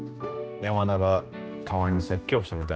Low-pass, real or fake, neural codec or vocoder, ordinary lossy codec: none; fake; codec, 16 kHz, 1 kbps, X-Codec, HuBERT features, trained on general audio; none